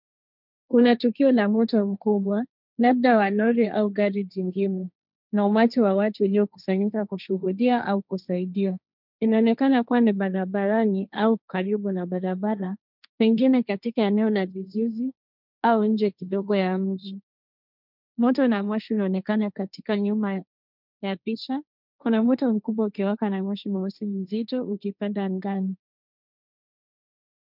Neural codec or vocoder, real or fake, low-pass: codec, 16 kHz, 1.1 kbps, Voila-Tokenizer; fake; 5.4 kHz